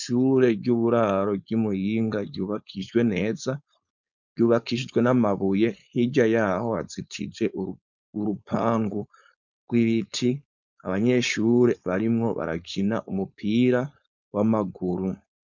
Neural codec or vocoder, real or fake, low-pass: codec, 16 kHz, 4.8 kbps, FACodec; fake; 7.2 kHz